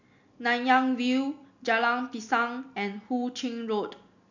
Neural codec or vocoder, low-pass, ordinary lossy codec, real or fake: none; 7.2 kHz; none; real